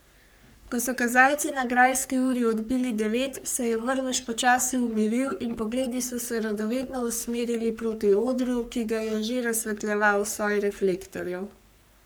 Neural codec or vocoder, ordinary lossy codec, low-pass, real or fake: codec, 44.1 kHz, 3.4 kbps, Pupu-Codec; none; none; fake